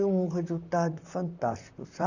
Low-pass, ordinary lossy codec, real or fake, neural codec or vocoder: 7.2 kHz; none; fake; vocoder, 44.1 kHz, 128 mel bands, Pupu-Vocoder